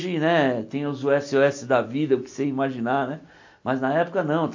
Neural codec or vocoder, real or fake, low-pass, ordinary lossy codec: none; real; 7.2 kHz; AAC, 48 kbps